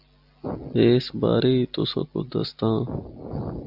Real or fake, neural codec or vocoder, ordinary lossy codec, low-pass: real; none; AAC, 48 kbps; 5.4 kHz